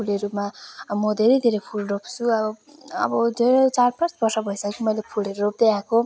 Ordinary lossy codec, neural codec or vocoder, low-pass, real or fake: none; none; none; real